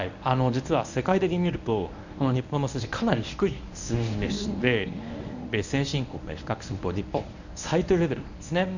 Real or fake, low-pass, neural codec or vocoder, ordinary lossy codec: fake; 7.2 kHz; codec, 24 kHz, 0.9 kbps, WavTokenizer, medium speech release version 1; none